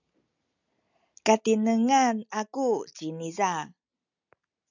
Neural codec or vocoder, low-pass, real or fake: none; 7.2 kHz; real